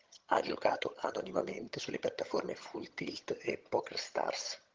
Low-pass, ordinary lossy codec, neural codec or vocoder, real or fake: 7.2 kHz; Opus, 16 kbps; vocoder, 22.05 kHz, 80 mel bands, HiFi-GAN; fake